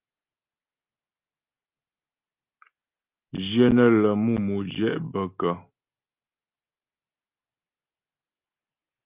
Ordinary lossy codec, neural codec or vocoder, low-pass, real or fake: Opus, 24 kbps; none; 3.6 kHz; real